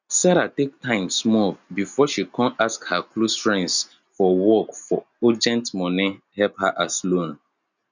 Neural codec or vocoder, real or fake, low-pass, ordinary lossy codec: none; real; 7.2 kHz; none